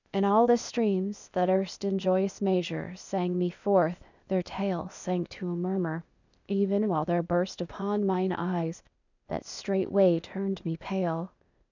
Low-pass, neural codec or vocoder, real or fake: 7.2 kHz; codec, 16 kHz, 0.8 kbps, ZipCodec; fake